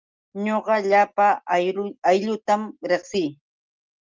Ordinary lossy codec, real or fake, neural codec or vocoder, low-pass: Opus, 32 kbps; real; none; 7.2 kHz